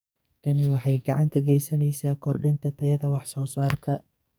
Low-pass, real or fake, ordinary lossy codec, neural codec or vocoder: none; fake; none; codec, 44.1 kHz, 2.6 kbps, SNAC